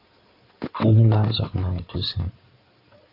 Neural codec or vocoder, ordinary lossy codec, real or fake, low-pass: codec, 16 kHz in and 24 kHz out, 2.2 kbps, FireRedTTS-2 codec; AAC, 32 kbps; fake; 5.4 kHz